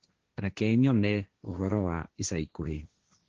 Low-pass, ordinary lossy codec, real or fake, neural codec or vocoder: 7.2 kHz; Opus, 16 kbps; fake; codec, 16 kHz, 1.1 kbps, Voila-Tokenizer